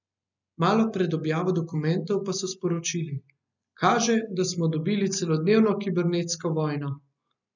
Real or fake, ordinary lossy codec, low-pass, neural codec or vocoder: real; none; 7.2 kHz; none